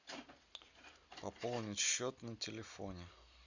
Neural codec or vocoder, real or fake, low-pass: none; real; 7.2 kHz